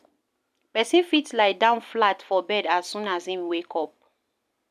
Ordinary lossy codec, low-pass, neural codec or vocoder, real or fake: none; 14.4 kHz; none; real